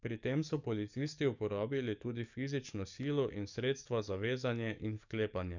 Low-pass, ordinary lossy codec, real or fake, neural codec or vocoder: 7.2 kHz; none; fake; codec, 44.1 kHz, 7.8 kbps, DAC